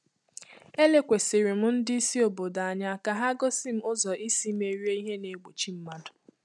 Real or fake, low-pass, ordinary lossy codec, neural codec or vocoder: real; none; none; none